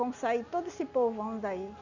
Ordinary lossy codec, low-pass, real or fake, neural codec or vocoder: none; 7.2 kHz; real; none